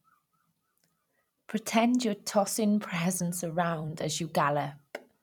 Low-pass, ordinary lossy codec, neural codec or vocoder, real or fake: 19.8 kHz; none; vocoder, 44.1 kHz, 128 mel bands every 256 samples, BigVGAN v2; fake